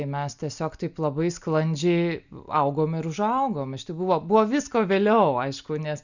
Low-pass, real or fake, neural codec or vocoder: 7.2 kHz; real; none